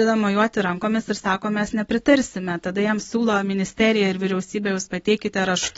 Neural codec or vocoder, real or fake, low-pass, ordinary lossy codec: none; real; 14.4 kHz; AAC, 24 kbps